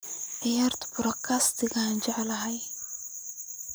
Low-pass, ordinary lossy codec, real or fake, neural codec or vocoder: none; none; real; none